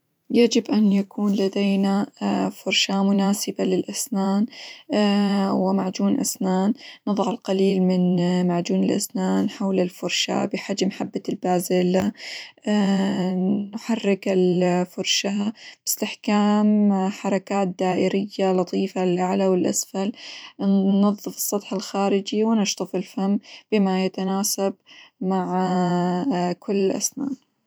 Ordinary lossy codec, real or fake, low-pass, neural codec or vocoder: none; fake; none; vocoder, 44.1 kHz, 128 mel bands every 512 samples, BigVGAN v2